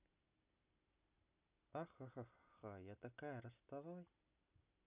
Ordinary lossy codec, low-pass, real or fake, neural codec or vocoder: none; 3.6 kHz; real; none